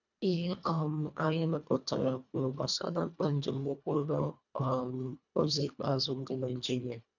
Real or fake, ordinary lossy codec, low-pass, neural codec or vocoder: fake; none; 7.2 kHz; codec, 24 kHz, 1.5 kbps, HILCodec